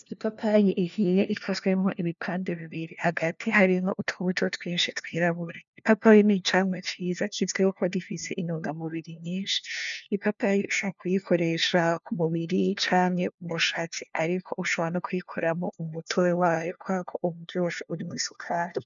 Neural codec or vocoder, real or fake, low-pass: codec, 16 kHz, 1 kbps, FunCodec, trained on LibriTTS, 50 frames a second; fake; 7.2 kHz